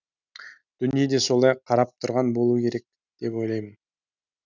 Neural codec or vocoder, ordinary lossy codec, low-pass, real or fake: none; none; 7.2 kHz; real